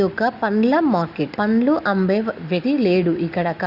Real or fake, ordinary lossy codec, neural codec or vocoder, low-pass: real; Opus, 64 kbps; none; 5.4 kHz